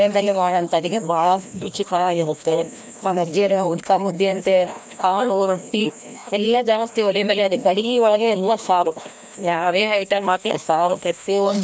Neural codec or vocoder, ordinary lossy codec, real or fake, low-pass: codec, 16 kHz, 1 kbps, FreqCodec, larger model; none; fake; none